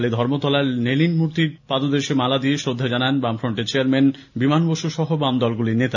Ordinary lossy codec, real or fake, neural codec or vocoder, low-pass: none; real; none; 7.2 kHz